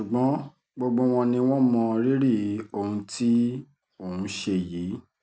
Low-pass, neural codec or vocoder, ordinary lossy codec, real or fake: none; none; none; real